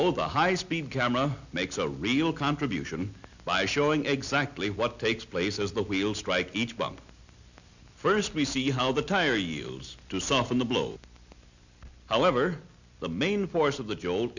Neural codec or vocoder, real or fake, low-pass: none; real; 7.2 kHz